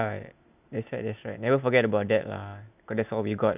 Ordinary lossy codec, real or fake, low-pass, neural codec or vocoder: none; real; 3.6 kHz; none